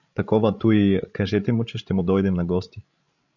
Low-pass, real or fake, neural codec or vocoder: 7.2 kHz; fake; codec, 16 kHz, 16 kbps, FreqCodec, larger model